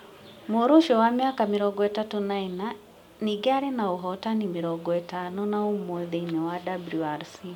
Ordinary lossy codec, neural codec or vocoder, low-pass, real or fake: MP3, 96 kbps; none; 19.8 kHz; real